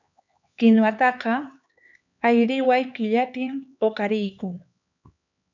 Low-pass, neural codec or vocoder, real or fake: 7.2 kHz; codec, 16 kHz, 4 kbps, X-Codec, HuBERT features, trained on LibriSpeech; fake